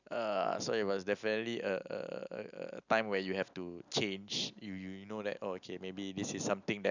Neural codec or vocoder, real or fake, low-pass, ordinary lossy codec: none; real; 7.2 kHz; none